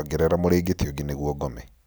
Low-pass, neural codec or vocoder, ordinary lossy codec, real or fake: none; none; none; real